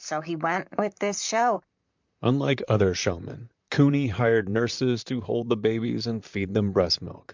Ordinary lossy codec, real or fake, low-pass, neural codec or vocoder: MP3, 64 kbps; fake; 7.2 kHz; vocoder, 44.1 kHz, 128 mel bands, Pupu-Vocoder